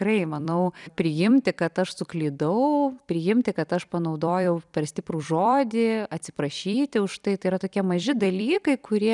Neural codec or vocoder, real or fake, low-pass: vocoder, 44.1 kHz, 128 mel bands every 256 samples, BigVGAN v2; fake; 10.8 kHz